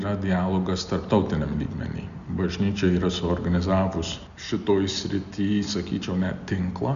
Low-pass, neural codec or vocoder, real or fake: 7.2 kHz; none; real